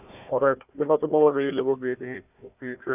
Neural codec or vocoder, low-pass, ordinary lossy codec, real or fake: codec, 16 kHz, 1 kbps, FunCodec, trained on Chinese and English, 50 frames a second; 3.6 kHz; none; fake